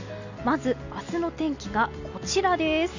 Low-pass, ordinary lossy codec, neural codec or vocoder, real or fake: 7.2 kHz; none; none; real